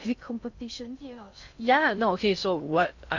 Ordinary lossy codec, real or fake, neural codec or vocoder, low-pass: AAC, 48 kbps; fake; codec, 16 kHz in and 24 kHz out, 0.6 kbps, FocalCodec, streaming, 2048 codes; 7.2 kHz